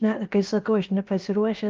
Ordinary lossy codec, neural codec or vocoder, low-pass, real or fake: Opus, 24 kbps; codec, 16 kHz, about 1 kbps, DyCAST, with the encoder's durations; 7.2 kHz; fake